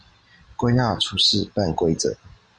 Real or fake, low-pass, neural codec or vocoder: real; 9.9 kHz; none